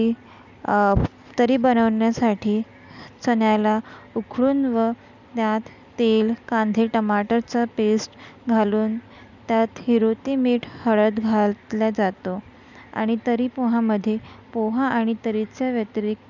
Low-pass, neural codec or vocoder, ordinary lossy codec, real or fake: 7.2 kHz; none; none; real